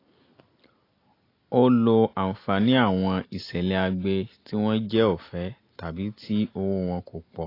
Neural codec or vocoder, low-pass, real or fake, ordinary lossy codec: none; 5.4 kHz; real; AAC, 32 kbps